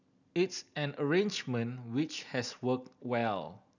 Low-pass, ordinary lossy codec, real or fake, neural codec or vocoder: 7.2 kHz; none; real; none